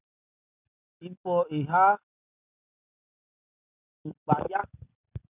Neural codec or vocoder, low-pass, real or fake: none; 3.6 kHz; real